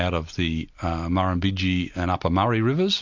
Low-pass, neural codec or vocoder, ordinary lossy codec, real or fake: 7.2 kHz; none; MP3, 64 kbps; real